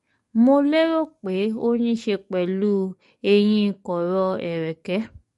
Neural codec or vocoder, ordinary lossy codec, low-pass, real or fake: codec, 44.1 kHz, 7.8 kbps, DAC; MP3, 48 kbps; 14.4 kHz; fake